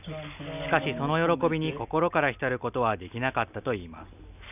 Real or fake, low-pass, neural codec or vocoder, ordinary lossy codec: real; 3.6 kHz; none; none